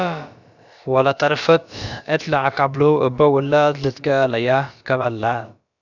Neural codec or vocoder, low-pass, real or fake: codec, 16 kHz, about 1 kbps, DyCAST, with the encoder's durations; 7.2 kHz; fake